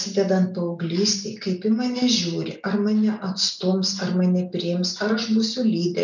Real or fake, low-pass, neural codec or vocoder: real; 7.2 kHz; none